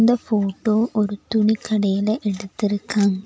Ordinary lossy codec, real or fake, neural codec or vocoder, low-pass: none; real; none; none